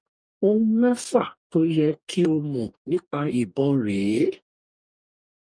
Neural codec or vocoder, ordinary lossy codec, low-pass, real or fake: codec, 44.1 kHz, 2.6 kbps, DAC; MP3, 96 kbps; 9.9 kHz; fake